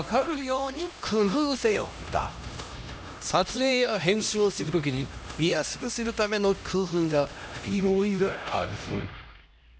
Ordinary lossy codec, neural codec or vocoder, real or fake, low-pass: none; codec, 16 kHz, 1 kbps, X-Codec, HuBERT features, trained on LibriSpeech; fake; none